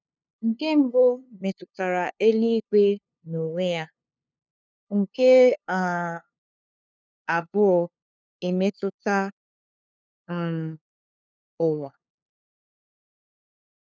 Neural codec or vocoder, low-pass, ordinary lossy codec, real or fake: codec, 16 kHz, 2 kbps, FunCodec, trained on LibriTTS, 25 frames a second; none; none; fake